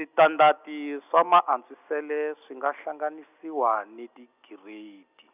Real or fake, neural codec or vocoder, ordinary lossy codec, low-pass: real; none; none; 3.6 kHz